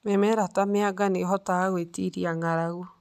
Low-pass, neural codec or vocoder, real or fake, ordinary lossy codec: 14.4 kHz; none; real; none